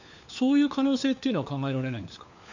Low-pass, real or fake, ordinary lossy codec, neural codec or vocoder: 7.2 kHz; fake; none; codec, 16 kHz, 4 kbps, FunCodec, trained on LibriTTS, 50 frames a second